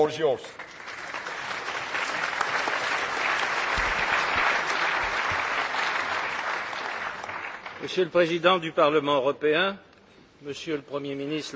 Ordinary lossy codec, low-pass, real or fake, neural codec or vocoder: none; none; real; none